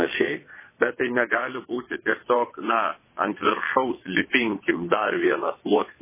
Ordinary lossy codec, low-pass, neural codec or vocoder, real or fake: MP3, 16 kbps; 3.6 kHz; vocoder, 22.05 kHz, 80 mel bands, WaveNeXt; fake